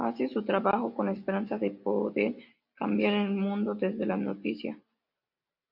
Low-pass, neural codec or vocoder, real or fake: 5.4 kHz; none; real